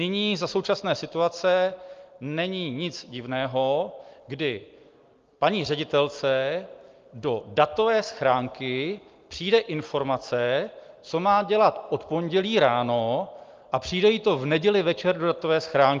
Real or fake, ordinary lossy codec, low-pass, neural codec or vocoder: real; Opus, 32 kbps; 7.2 kHz; none